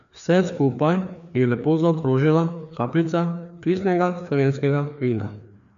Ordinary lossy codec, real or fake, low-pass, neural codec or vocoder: none; fake; 7.2 kHz; codec, 16 kHz, 2 kbps, FreqCodec, larger model